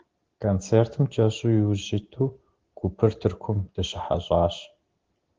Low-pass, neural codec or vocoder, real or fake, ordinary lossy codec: 7.2 kHz; none; real; Opus, 16 kbps